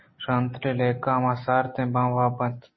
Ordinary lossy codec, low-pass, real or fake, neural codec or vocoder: MP3, 24 kbps; 7.2 kHz; real; none